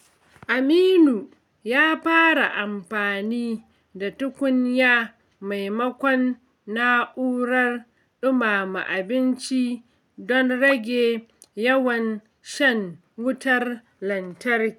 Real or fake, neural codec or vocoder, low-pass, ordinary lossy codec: real; none; 19.8 kHz; none